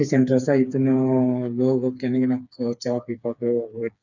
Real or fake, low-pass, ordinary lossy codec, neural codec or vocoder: fake; 7.2 kHz; none; codec, 16 kHz, 4 kbps, FreqCodec, smaller model